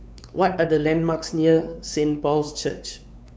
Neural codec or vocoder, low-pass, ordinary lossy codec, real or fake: codec, 16 kHz, 4 kbps, X-Codec, WavLM features, trained on Multilingual LibriSpeech; none; none; fake